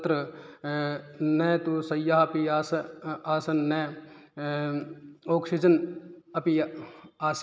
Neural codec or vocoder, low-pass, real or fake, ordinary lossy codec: none; none; real; none